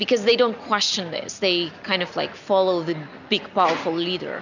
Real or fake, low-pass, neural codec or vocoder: real; 7.2 kHz; none